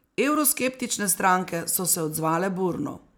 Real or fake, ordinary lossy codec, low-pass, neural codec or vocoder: real; none; none; none